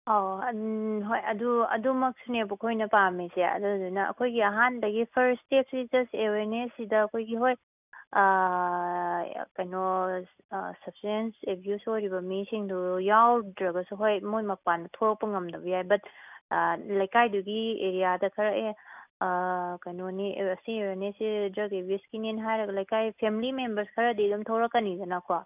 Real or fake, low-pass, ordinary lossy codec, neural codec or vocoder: real; 3.6 kHz; none; none